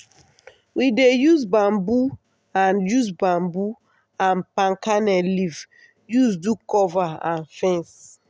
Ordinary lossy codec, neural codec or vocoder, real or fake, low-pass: none; none; real; none